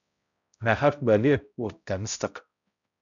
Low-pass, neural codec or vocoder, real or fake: 7.2 kHz; codec, 16 kHz, 0.5 kbps, X-Codec, HuBERT features, trained on balanced general audio; fake